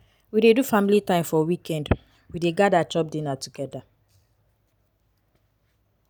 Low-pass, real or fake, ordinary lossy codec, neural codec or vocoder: none; real; none; none